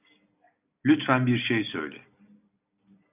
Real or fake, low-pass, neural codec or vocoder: real; 3.6 kHz; none